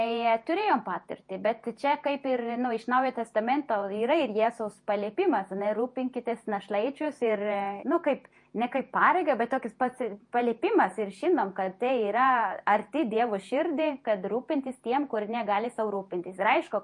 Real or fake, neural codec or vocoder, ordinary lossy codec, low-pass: fake; vocoder, 48 kHz, 128 mel bands, Vocos; MP3, 48 kbps; 10.8 kHz